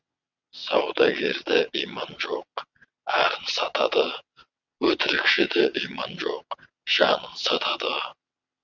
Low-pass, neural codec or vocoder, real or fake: 7.2 kHz; vocoder, 22.05 kHz, 80 mel bands, WaveNeXt; fake